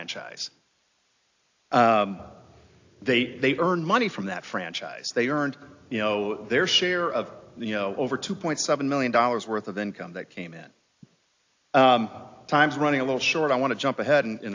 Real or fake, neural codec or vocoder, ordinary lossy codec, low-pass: real; none; AAC, 48 kbps; 7.2 kHz